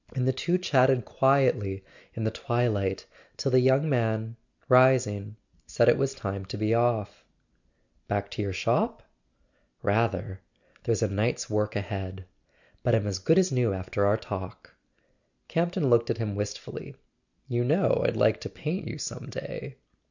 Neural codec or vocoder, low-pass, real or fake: none; 7.2 kHz; real